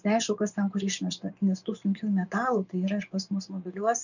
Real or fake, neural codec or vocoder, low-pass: fake; vocoder, 24 kHz, 100 mel bands, Vocos; 7.2 kHz